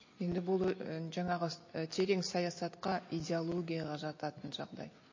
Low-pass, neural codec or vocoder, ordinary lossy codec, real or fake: 7.2 kHz; none; MP3, 32 kbps; real